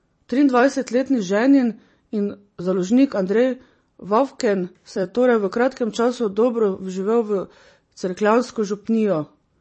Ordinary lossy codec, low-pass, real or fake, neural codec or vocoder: MP3, 32 kbps; 10.8 kHz; fake; vocoder, 44.1 kHz, 128 mel bands every 256 samples, BigVGAN v2